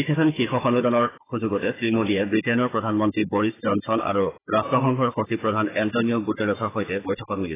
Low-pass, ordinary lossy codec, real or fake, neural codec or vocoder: 3.6 kHz; AAC, 16 kbps; fake; codec, 16 kHz, 4 kbps, FunCodec, trained on Chinese and English, 50 frames a second